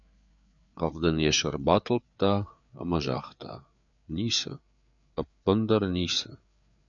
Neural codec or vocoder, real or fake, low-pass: codec, 16 kHz, 4 kbps, FreqCodec, larger model; fake; 7.2 kHz